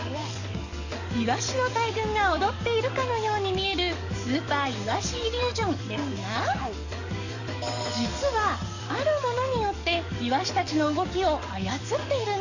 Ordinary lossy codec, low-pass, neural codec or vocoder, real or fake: none; 7.2 kHz; codec, 44.1 kHz, 7.8 kbps, DAC; fake